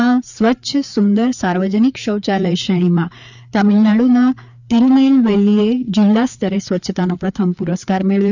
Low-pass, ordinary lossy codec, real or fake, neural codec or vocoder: 7.2 kHz; none; fake; codec, 16 kHz, 4 kbps, FreqCodec, larger model